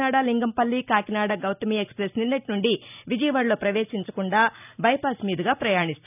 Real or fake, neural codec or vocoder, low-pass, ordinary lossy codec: real; none; 3.6 kHz; none